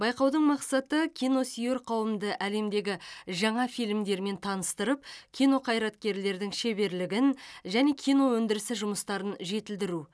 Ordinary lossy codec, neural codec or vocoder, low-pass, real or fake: none; none; none; real